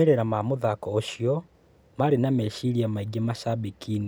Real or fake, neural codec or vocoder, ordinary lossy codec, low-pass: real; none; none; none